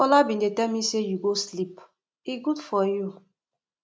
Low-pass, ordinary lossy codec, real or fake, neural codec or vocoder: none; none; real; none